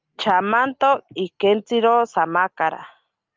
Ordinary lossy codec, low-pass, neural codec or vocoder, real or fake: Opus, 24 kbps; 7.2 kHz; none; real